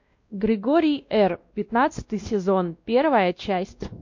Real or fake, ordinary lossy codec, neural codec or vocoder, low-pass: fake; MP3, 48 kbps; codec, 16 kHz, 1 kbps, X-Codec, WavLM features, trained on Multilingual LibriSpeech; 7.2 kHz